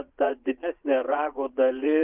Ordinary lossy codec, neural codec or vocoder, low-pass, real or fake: Opus, 32 kbps; vocoder, 22.05 kHz, 80 mel bands, Vocos; 3.6 kHz; fake